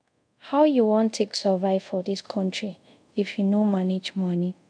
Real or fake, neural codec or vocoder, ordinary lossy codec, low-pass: fake; codec, 24 kHz, 0.5 kbps, DualCodec; none; 9.9 kHz